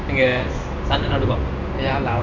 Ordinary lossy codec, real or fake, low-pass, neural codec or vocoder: none; fake; 7.2 kHz; codec, 16 kHz in and 24 kHz out, 1 kbps, XY-Tokenizer